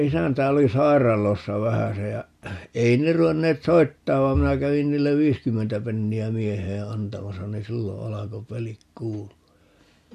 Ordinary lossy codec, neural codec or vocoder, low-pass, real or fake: MP3, 64 kbps; none; 14.4 kHz; real